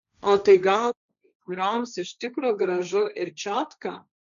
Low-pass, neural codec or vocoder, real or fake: 7.2 kHz; codec, 16 kHz, 1.1 kbps, Voila-Tokenizer; fake